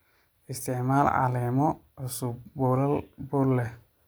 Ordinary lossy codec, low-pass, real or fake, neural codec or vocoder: none; none; real; none